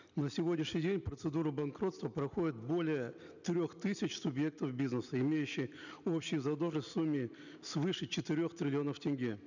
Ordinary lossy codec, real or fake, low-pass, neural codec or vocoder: none; real; 7.2 kHz; none